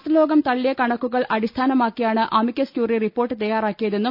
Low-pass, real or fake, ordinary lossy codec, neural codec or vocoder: 5.4 kHz; real; none; none